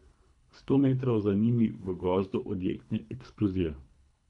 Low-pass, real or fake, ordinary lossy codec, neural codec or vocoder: 10.8 kHz; fake; MP3, 96 kbps; codec, 24 kHz, 3 kbps, HILCodec